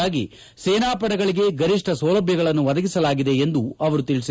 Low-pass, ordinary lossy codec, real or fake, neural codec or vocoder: none; none; real; none